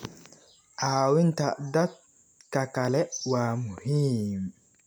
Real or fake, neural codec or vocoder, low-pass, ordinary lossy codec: real; none; none; none